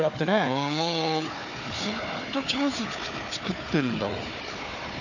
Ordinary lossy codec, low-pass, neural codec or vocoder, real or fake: none; 7.2 kHz; codec, 16 kHz, 4 kbps, FunCodec, trained on Chinese and English, 50 frames a second; fake